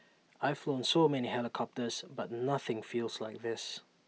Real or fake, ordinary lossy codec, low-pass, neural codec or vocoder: real; none; none; none